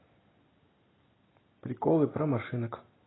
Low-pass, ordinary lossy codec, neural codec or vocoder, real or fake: 7.2 kHz; AAC, 16 kbps; none; real